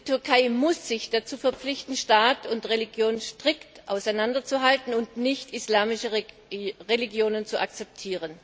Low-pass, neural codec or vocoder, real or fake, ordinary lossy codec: none; none; real; none